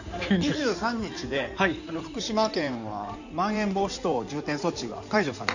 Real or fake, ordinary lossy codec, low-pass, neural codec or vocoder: fake; none; 7.2 kHz; codec, 16 kHz in and 24 kHz out, 2.2 kbps, FireRedTTS-2 codec